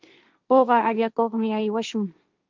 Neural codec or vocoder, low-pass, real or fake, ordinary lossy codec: codec, 16 kHz, 1.1 kbps, Voila-Tokenizer; 7.2 kHz; fake; Opus, 24 kbps